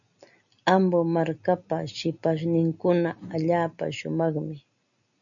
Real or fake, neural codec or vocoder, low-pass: real; none; 7.2 kHz